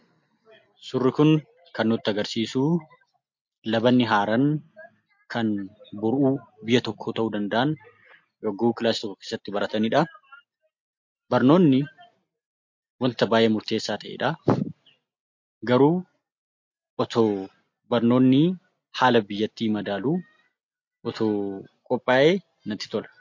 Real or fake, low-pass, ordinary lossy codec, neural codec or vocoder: real; 7.2 kHz; MP3, 48 kbps; none